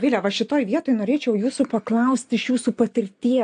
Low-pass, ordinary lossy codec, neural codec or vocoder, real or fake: 9.9 kHz; AAC, 64 kbps; none; real